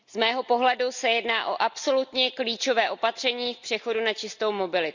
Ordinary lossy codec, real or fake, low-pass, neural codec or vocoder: none; real; 7.2 kHz; none